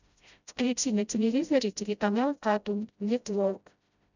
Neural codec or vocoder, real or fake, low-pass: codec, 16 kHz, 0.5 kbps, FreqCodec, smaller model; fake; 7.2 kHz